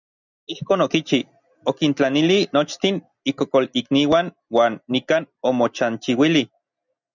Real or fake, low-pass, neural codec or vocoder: real; 7.2 kHz; none